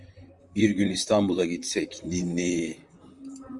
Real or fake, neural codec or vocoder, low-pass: fake; vocoder, 44.1 kHz, 128 mel bands, Pupu-Vocoder; 10.8 kHz